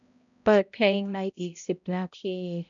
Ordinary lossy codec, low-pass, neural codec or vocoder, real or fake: MP3, 96 kbps; 7.2 kHz; codec, 16 kHz, 0.5 kbps, X-Codec, HuBERT features, trained on balanced general audio; fake